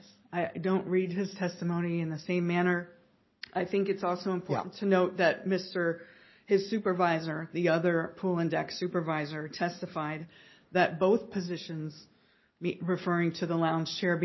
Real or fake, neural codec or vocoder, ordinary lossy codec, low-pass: real; none; MP3, 24 kbps; 7.2 kHz